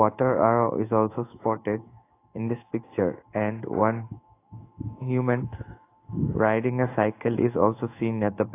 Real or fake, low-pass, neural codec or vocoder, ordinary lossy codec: fake; 3.6 kHz; codec, 16 kHz in and 24 kHz out, 1 kbps, XY-Tokenizer; AAC, 24 kbps